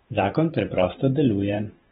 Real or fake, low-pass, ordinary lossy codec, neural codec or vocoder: fake; 19.8 kHz; AAC, 16 kbps; vocoder, 44.1 kHz, 128 mel bands every 512 samples, BigVGAN v2